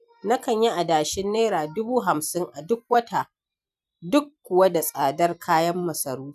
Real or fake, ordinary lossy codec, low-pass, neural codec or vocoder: fake; none; 14.4 kHz; vocoder, 44.1 kHz, 128 mel bands every 256 samples, BigVGAN v2